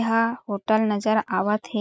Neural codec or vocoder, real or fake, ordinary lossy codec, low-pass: none; real; none; none